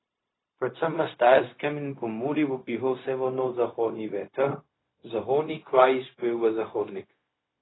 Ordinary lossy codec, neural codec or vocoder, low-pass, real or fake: AAC, 16 kbps; codec, 16 kHz, 0.4 kbps, LongCat-Audio-Codec; 7.2 kHz; fake